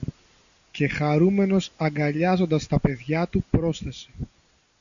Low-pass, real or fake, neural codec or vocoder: 7.2 kHz; real; none